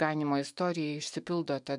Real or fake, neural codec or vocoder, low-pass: fake; autoencoder, 48 kHz, 128 numbers a frame, DAC-VAE, trained on Japanese speech; 10.8 kHz